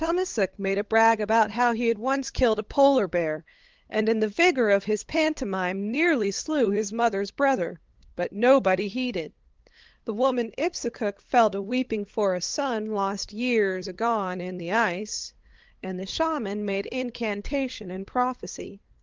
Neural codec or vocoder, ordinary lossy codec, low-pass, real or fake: codec, 16 kHz, 8 kbps, FunCodec, trained on LibriTTS, 25 frames a second; Opus, 16 kbps; 7.2 kHz; fake